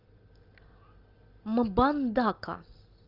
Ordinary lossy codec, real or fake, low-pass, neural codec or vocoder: Opus, 32 kbps; real; 5.4 kHz; none